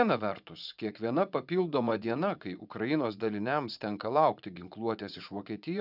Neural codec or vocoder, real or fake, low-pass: vocoder, 44.1 kHz, 80 mel bands, Vocos; fake; 5.4 kHz